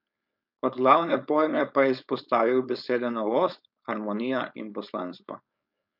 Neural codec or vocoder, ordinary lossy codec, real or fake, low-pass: codec, 16 kHz, 4.8 kbps, FACodec; none; fake; 5.4 kHz